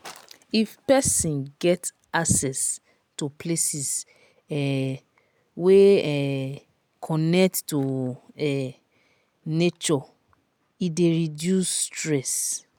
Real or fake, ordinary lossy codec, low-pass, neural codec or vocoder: real; none; none; none